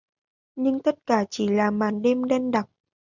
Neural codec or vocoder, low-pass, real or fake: none; 7.2 kHz; real